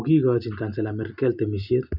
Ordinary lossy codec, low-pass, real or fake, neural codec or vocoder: none; 5.4 kHz; real; none